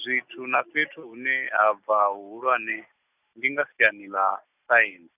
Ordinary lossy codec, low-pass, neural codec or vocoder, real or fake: none; 3.6 kHz; none; real